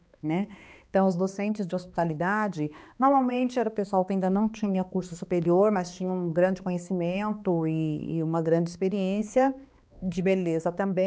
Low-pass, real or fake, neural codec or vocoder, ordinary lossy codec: none; fake; codec, 16 kHz, 2 kbps, X-Codec, HuBERT features, trained on balanced general audio; none